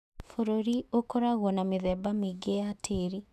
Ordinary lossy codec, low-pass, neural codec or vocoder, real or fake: none; 14.4 kHz; autoencoder, 48 kHz, 128 numbers a frame, DAC-VAE, trained on Japanese speech; fake